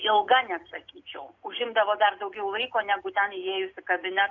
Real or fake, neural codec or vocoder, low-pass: real; none; 7.2 kHz